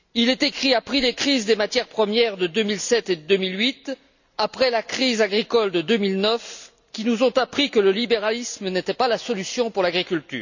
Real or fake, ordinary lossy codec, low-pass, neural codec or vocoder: real; none; 7.2 kHz; none